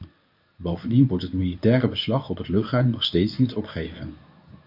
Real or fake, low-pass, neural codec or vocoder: fake; 5.4 kHz; codec, 24 kHz, 0.9 kbps, WavTokenizer, medium speech release version 1